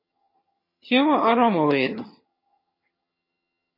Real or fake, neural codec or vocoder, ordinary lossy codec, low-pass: fake; vocoder, 22.05 kHz, 80 mel bands, HiFi-GAN; MP3, 24 kbps; 5.4 kHz